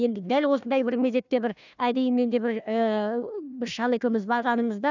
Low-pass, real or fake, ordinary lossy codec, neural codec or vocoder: 7.2 kHz; fake; none; codec, 16 kHz, 1 kbps, FunCodec, trained on Chinese and English, 50 frames a second